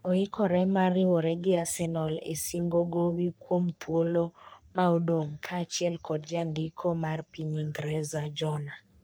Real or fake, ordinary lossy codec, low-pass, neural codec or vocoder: fake; none; none; codec, 44.1 kHz, 3.4 kbps, Pupu-Codec